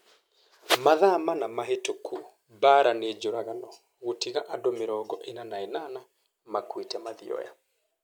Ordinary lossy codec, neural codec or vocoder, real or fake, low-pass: none; none; real; none